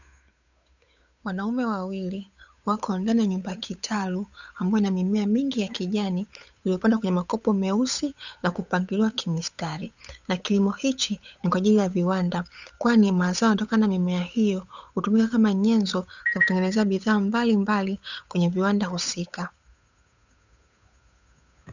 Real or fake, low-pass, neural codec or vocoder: fake; 7.2 kHz; codec, 16 kHz, 8 kbps, FunCodec, trained on Chinese and English, 25 frames a second